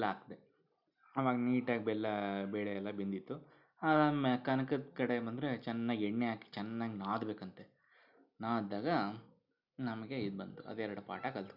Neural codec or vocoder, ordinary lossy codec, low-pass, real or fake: none; none; 5.4 kHz; real